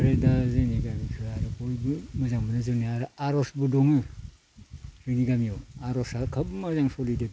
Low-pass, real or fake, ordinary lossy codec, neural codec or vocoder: none; real; none; none